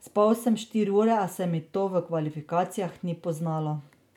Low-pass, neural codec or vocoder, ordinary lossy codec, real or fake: 19.8 kHz; none; none; real